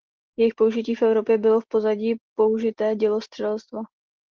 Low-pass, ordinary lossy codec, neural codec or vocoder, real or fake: 7.2 kHz; Opus, 16 kbps; none; real